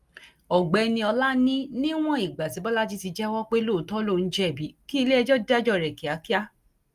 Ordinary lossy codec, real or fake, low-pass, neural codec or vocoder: Opus, 32 kbps; real; 14.4 kHz; none